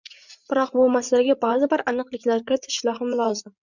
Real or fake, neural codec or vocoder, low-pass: fake; vocoder, 44.1 kHz, 80 mel bands, Vocos; 7.2 kHz